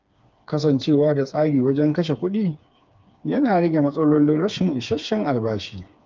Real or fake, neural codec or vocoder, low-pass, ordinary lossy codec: fake; codec, 16 kHz, 4 kbps, FreqCodec, smaller model; 7.2 kHz; Opus, 24 kbps